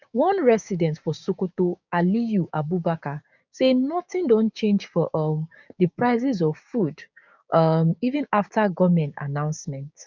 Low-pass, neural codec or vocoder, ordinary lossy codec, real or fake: 7.2 kHz; codec, 16 kHz, 6 kbps, DAC; none; fake